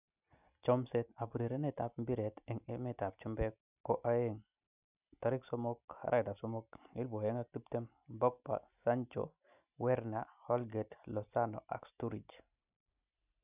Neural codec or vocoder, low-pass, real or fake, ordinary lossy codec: none; 3.6 kHz; real; none